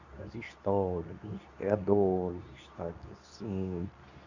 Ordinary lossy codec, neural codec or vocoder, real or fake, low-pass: none; codec, 24 kHz, 0.9 kbps, WavTokenizer, medium speech release version 2; fake; 7.2 kHz